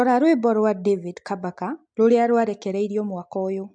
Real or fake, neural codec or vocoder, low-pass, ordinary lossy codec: real; none; 9.9 kHz; MP3, 64 kbps